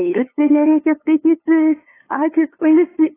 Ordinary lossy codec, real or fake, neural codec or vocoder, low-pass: AAC, 16 kbps; fake; codec, 16 kHz, 2 kbps, FunCodec, trained on LibriTTS, 25 frames a second; 3.6 kHz